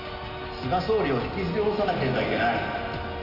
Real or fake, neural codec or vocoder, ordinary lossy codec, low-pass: real; none; none; 5.4 kHz